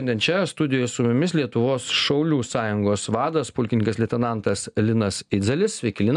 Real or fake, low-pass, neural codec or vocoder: real; 10.8 kHz; none